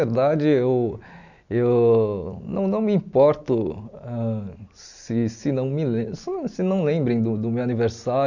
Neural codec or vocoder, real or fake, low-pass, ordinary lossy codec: none; real; 7.2 kHz; none